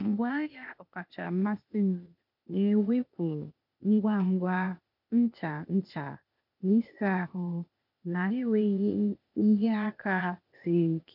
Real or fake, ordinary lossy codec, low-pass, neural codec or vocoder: fake; MP3, 48 kbps; 5.4 kHz; codec, 16 kHz, 0.8 kbps, ZipCodec